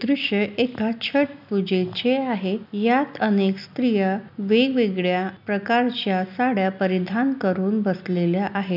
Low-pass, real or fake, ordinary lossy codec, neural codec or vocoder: 5.4 kHz; real; none; none